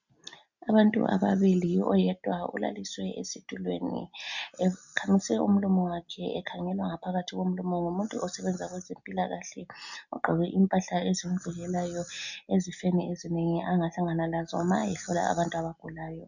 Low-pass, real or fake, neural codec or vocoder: 7.2 kHz; real; none